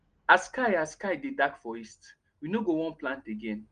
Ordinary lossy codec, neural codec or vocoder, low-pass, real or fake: Opus, 24 kbps; none; 9.9 kHz; real